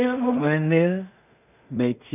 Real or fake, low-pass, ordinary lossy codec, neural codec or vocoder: fake; 3.6 kHz; none; codec, 16 kHz in and 24 kHz out, 0.4 kbps, LongCat-Audio-Codec, two codebook decoder